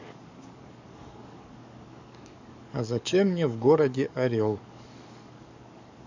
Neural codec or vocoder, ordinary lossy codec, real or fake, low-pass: codec, 44.1 kHz, 7.8 kbps, DAC; none; fake; 7.2 kHz